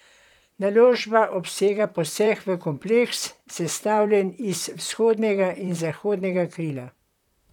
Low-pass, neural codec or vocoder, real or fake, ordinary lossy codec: 19.8 kHz; vocoder, 44.1 kHz, 128 mel bands, Pupu-Vocoder; fake; none